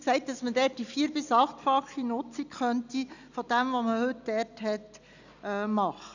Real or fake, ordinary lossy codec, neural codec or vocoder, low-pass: real; none; none; 7.2 kHz